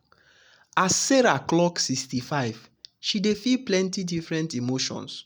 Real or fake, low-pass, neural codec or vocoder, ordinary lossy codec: real; none; none; none